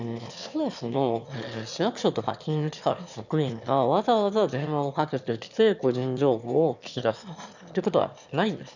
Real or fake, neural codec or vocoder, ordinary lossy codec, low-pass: fake; autoencoder, 22.05 kHz, a latent of 192 numbers a frame, VITS, trained on one speaker; none; 7.2 kHz